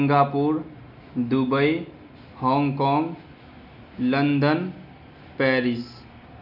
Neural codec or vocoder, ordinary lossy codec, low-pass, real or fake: none; none; 5.4 kHz; real